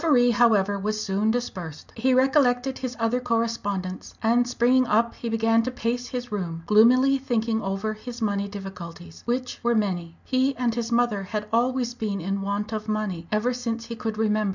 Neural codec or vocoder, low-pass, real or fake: none; 7.2 kHz; real